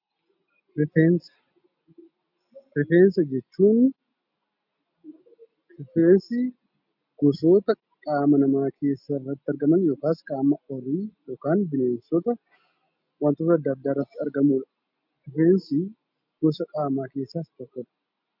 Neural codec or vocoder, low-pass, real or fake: none; 5.4 kHz; real